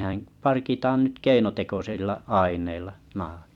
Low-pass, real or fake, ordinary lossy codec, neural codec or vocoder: 19.8 kHz; fake; none; vocoder, 44.1 kHz, 128 mel bands every 256 samples, BigVGAN v2